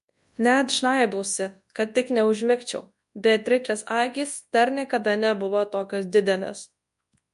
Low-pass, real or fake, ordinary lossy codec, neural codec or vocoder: 10.8 kHz; fake; MP3, 64 kbps; codec, 24 kHz, 0.9 kbps, WavTokenizer, large speech release